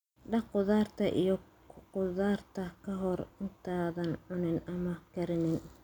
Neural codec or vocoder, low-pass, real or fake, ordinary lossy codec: none; 19.8 kHz; real; none